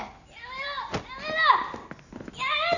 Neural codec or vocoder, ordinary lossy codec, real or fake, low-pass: none; none; real; 7.2 kHz